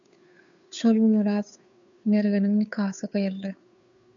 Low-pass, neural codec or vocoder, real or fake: 7.2 kHz; codec, 16 kHz, 2 kbps, FunCodec, trained on Chinese and English, 25 frames a second; fake